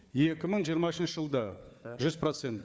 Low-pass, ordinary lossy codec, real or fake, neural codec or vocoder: none; none; fake; codec, 16 kHz, 4 kbps, FunCodec, trained on Chinese and English, 50 frames a second